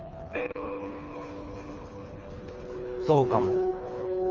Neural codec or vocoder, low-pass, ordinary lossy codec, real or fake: codec, 24 kHz, 3 kbps, HILCodec; 7.2 kHz; Opus, 32 kbps; fake